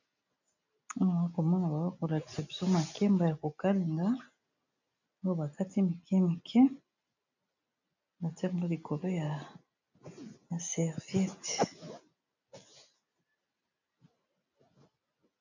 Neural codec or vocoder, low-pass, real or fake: none; 7.2 kHz; real